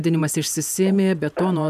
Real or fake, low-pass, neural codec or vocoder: fake; 14.4 kHz; vocoder, 48 kHz, 128 mel bands, Vocos